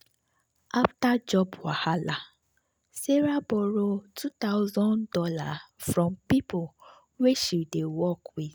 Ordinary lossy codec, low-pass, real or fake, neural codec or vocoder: none; none; real; none